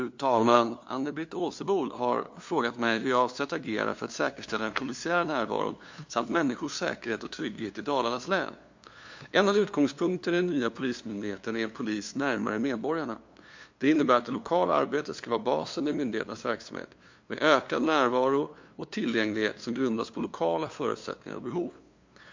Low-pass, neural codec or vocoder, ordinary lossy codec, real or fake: 7.2 kHz; codec, 16 kHz, 2 kbps, FunCodec, trained on LibriTTS, 25 frames a second; MP3, 48 kbps; fake